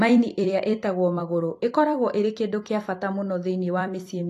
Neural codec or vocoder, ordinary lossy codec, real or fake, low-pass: vocoder, 44.1 kHz, 128 mel bands every 256 samples, BigVGAN v2; AAC, 48 kbps; fake; 14.4 kHz